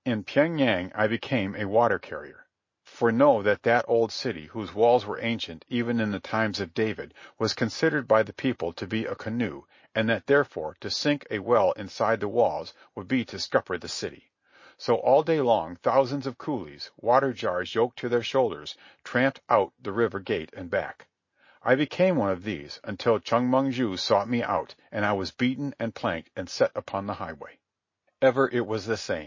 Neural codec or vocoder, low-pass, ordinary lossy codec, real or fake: none; 7.2 kHz; MP3, 32 kbps; real